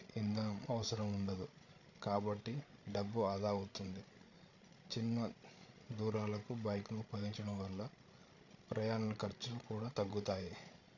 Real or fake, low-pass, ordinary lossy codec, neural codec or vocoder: fake; 7.2 kHz; none; codec, 16 kHz, 16 kbps, FreqCodec, larger model